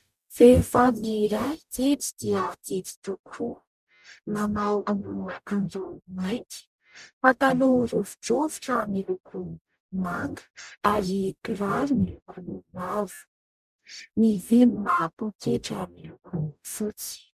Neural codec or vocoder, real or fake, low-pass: codec, 44.1 kHz, 0.9 kbps, DAC; fake; 14.4 kHz